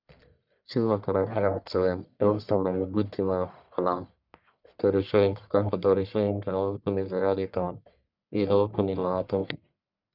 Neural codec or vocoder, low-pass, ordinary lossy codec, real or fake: codec, 44.1 kHz, 1.7 kbps, Pupu-Codec; 5.4 kHz; none; fake